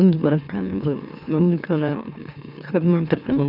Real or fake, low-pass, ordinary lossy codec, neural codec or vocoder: fake; 5.4 kHz; none; autoencoder, 44.1 kHz, a latent of 192 numbers a frame, MeloTTS